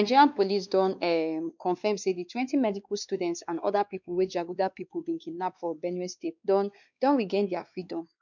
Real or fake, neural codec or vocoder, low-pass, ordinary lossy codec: fake; codec, 16 kHz, 2 kbps, X-Codec, WavLM features, trained on Multilingual LibriSpeech; 7.2 kHz; none